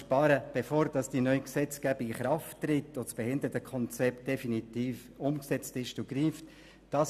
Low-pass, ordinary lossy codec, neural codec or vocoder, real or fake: 14.4 kHz; none; none; real